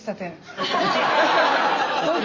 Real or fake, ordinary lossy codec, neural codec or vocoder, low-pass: real; Opus, 32 kbps; none; 7.2 kHz